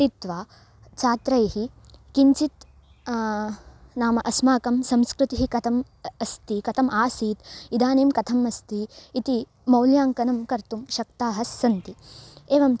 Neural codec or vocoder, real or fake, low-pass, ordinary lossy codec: none; real; none; none